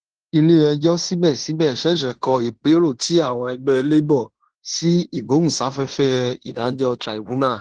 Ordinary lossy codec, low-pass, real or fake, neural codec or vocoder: Opus, 16 kbps; 9.9 kHz; fake; codec, 16 kHz in and 24 kHz out, 0.9 kbps, LongCat-Audio-Codec, fine tuned four codebook decoder